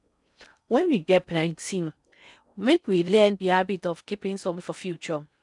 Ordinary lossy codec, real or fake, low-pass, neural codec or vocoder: none; fake; 10.8 kHz; codec, 16 kHz in and 24 kHz out, 0.6 kbps, FocalCodec, streaming, 4096 codes